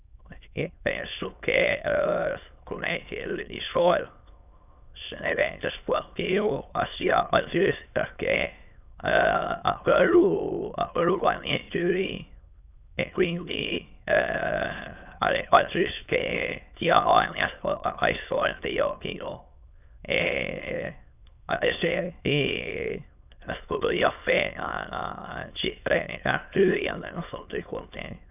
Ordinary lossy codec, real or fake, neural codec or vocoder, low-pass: none; fake; autoencoder, 22.05 kHz, a latent of 192 numbers a frame, VITS, trained on many speakers; 3.6 kHz